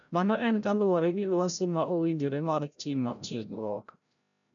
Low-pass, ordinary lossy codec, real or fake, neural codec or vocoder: 7.2 kHz; none; fake; codec, 16 kHz, 0.5 kbps, FreqCodec, larger model